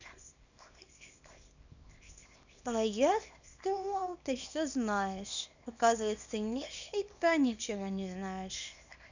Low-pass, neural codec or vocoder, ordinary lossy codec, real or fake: 7.2 kHz; codec, 24 kHz, 0.9 kbps, WavTokenizer, small release; none; fake